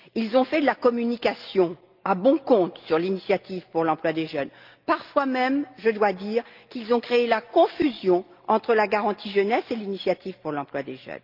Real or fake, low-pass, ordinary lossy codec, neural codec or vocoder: real; 5.4 kHz; Opus, 24 kbps; none